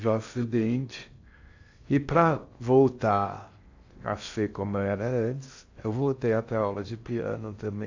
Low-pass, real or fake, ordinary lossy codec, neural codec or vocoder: 7.2 kHz; fake; AAC, 48 kbps; codec, 16 kHz in and 24 kHz out, 0.6 kbps, FocalCodec, streaming, 2048 codes